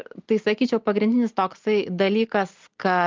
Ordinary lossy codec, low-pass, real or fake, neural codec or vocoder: Opus, 32 kbps; 7.2 kHz; real; none